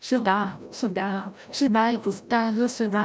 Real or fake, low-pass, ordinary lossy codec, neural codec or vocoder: fake; none; none; codec, 16 kHz, 0.5 kbps, FreqCodec, larger model